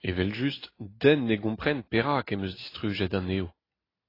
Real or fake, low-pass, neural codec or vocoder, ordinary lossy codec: real; 5.4 kHz; none; AAC, 24 kbps